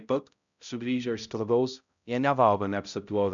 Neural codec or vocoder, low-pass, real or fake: codec, 16 kHz, 0.5 kbps, X-Codec, HuBERT features, trained on balanced general audio; 7.2 kHz; fake